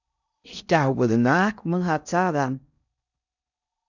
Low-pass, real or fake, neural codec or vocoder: 7.2 kHz; fake; codec, 16 kHz in and 24 kHz out, 0.6 kbps, FocalCodec, streaming, 4096 codes